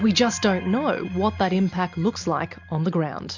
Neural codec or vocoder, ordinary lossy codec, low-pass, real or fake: none; MP3, 64 kbps; 7.2 kHz; real